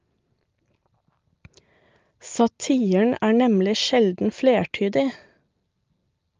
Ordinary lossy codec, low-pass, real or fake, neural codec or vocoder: Opus, 32 kbps; 7.2 kHz; real; none